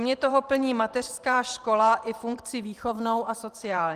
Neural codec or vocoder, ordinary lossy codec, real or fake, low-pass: vocoder, 44.1 kHz, 128 mel bands every 512 samples, BigVGAN v2; Opus, 24 kbps; fake; 14.4 kHz